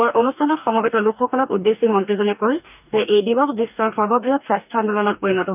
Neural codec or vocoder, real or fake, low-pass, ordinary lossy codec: codec, 44.1 kHz, 2.6 kbps, DAC; fake; 3.6 kHz; none